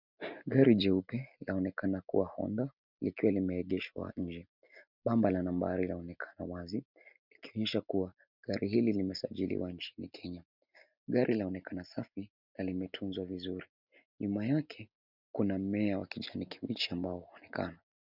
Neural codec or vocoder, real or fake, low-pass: none; real; 5.4 kHz